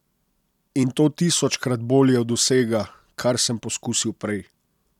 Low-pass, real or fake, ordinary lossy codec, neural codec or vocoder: 19.8 kHz; real; none; none